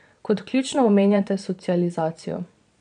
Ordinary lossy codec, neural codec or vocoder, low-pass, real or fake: none; vocoder, 22.05 kHz, 80 mel bands, Vocos; 9.9 kHz; fake